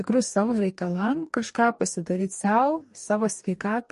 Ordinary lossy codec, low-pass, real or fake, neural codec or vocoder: MP3, 48 kbps; 14.4 kHz; fake; codec, 44.1 kHz, 2.6 kbps, DAC